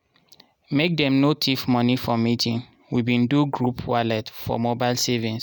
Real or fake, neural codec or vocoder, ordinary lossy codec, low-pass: real; none; none; none